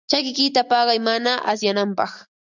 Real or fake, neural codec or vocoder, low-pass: real; none; 7.2 kHz